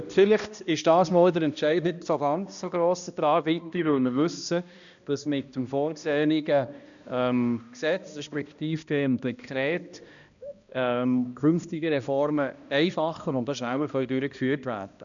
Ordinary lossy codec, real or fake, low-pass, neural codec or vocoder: MP3, 96 kbps; fake; 7.2 kHz; codec, 16 kHz, 1 kbps, X-Codec, HuBERT features, trained on balanced general audio